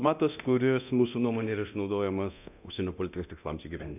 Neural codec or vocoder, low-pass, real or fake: codec, 24 kHz, 0.9 kbps, DualCodec; 3.6 kHz; fake